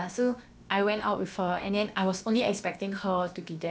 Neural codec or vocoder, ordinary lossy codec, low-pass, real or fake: codec, 16 kHz, about 1 kbps, DyCAST, with the encoder's durations; none; none; fake